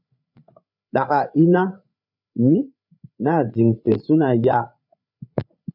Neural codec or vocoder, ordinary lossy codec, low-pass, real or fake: codec, 16 kHz, 8 kbps, FreqCodec, larger model; AAC, 48 kbps; 5.4 kHz; fake